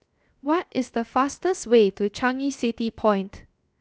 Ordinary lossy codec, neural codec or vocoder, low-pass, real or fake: none; codec, 16 kHz, 0.7 kbps, FocalCodec; none; fake